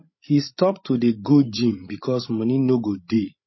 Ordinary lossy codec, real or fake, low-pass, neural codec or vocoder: MP3, 24 kbps; fake; 7.2 kHz; codec, 16 kHz, 16 kbps, FreqCodec, larger model